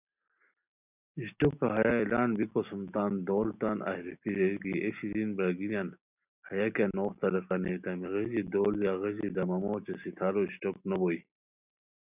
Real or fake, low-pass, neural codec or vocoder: real; 3.6 kHz; none